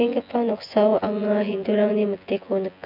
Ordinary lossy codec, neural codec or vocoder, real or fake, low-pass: MP3, 48 kbps; vocoder, 24 kHz, 100 mel bands, Vocos; fake; 5.4 kHz